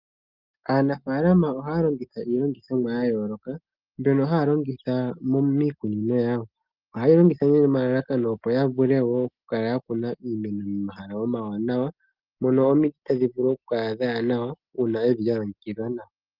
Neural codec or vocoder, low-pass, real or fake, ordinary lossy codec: none; 5.4 kHz; real; Opus, 24 kbps